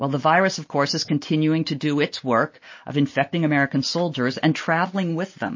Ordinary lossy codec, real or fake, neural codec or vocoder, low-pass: MP3, 32 kbps; real; none; 7.2 kHz